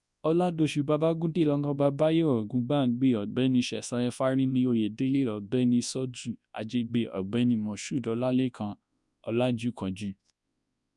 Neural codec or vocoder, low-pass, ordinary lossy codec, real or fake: codec, 24 kHz, 0.9 kbps, WavTokenizer, large speech release; 10.8 kHz; none; fake